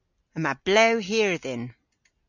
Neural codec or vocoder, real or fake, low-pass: none; real; 7.2 kHz